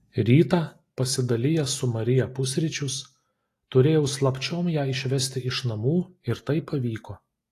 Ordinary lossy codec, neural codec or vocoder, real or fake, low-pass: AAC, 48 kbps; none; real; 14.4 kHz